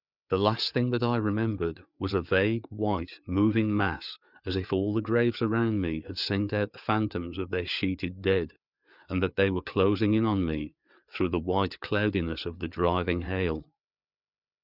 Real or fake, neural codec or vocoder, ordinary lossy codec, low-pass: fake; codec, 16 kHz, 4 kbps, FreqCodec, larger model; Opus, 64 kbps; 5.4 kHz